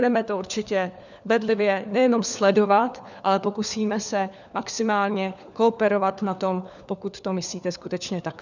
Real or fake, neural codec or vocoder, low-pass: fake; codec, 16 kHz, 4 kbps, FunCodec, trained on LibriTTS, 50 frames a second; 7.2 kHz